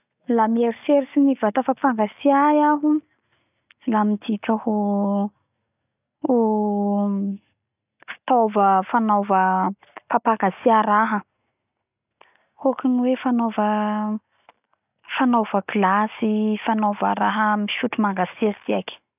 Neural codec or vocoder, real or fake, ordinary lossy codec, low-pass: none; real; none; 3.6 kHz